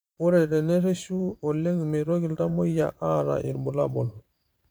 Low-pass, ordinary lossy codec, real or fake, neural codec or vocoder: none; none; fake; vocoder, 44.1 kHz, 128 mel bands every 512 samples, BigVGAN v2